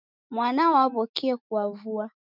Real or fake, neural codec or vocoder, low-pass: fake; autoencoder, 48 kHz, 128 numbers a frame, DAC-VAE, trained on Japanese speech; 5.4 kHz